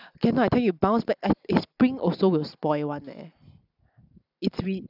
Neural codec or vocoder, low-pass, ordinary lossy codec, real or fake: none; 5.4 kHz; none; real